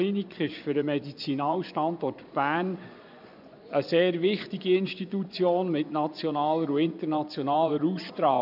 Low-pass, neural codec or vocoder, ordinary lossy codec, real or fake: 5.4 kHz; vocoder, 24 kHz, 100 mel bands, Vocos; none; fake